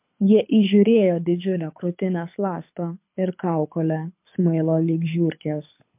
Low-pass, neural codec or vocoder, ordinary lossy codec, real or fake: 3.6 kHz; codec, 24 kHz, 6 kbps, HILCodec; MP3, 32 kbps; fake